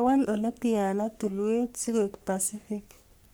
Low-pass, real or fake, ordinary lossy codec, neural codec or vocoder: none; fake; none; codec, 44.1 kHz, 3.4 kbps, Pupu-Codec